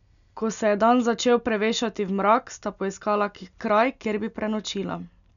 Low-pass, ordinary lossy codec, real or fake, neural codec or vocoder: 7.2 kHz; none; real; none